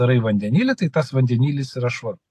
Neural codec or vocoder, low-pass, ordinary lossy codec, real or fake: none; 14.4 kHz; AAC, 64 kbps; real